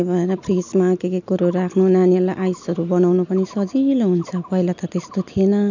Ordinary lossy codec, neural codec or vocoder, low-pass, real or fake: none; none; 7.2 kHz; real